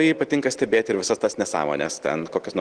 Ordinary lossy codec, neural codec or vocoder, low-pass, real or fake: Opus, 16 kbps; none; 9.9 kHz; real